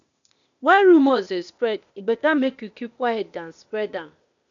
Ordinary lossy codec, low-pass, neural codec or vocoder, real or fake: none; 7.2 kHz; codec, 16 kHz, 0.8 kbps, ZipCodec; fake